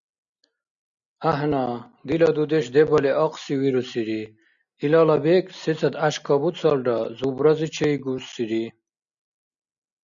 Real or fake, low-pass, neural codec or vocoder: real; 7.2 kHz; none